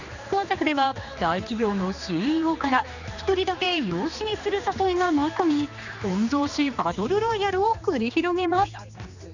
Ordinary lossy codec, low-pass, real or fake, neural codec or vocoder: none; 7.2 kHz; fake; codec, 16 kHz, 2 kbps, X-Codec, HuBERT features, trained on general audio